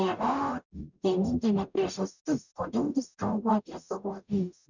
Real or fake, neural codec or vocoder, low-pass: fake; codec, 44.1 kHz, 0.9 kbps, DAC; 7.2 kHz